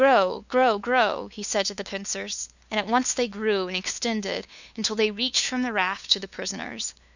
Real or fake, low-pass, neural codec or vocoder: fake; 7.2 kHz; codec, 16 kHz, 2 kbps, FunCodec, trained on LibriTTS, 25 frames a second